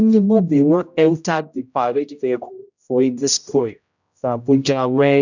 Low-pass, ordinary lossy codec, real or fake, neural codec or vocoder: 7.2 kHz; none; fake; codec, 16 kHz, 0.5 kbps, X-Codec, HuBERT features, trained on general audio